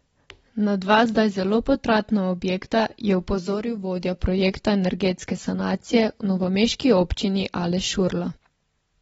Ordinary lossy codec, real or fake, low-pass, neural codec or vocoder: AAC, 24 kbps; real; 9.9 kHz; none